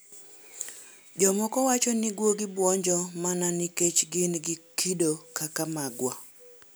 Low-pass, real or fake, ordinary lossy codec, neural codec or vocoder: none; real; none; none